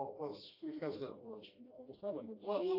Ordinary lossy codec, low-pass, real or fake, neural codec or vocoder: AAC, 48 kbps; 5.4 kHz; fake; codec, 16 kHz, 1 kbps, FreqCodec, smaller model